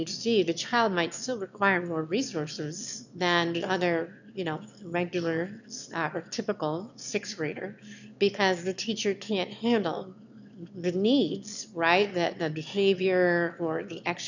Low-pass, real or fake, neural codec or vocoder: 7.2 kHz; fake; autoencoder, 22.05 kHz, a latent of 192 numbers a frame, VITS, trained on one speaker